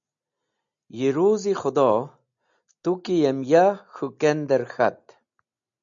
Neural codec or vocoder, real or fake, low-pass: none; real; 7.2 kHz